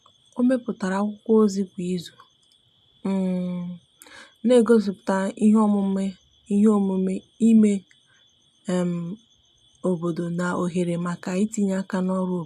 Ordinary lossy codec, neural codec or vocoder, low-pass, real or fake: MP3, 96 kbps; none; 14.4 kHz; real